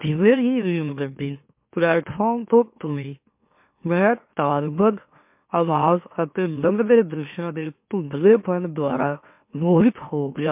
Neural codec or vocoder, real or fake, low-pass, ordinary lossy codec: autoencoder, 44.1 kHz, a latent of 192 numbers a frame, MeloTTS; fake; 3.6 kHz; MP3, 32 kbps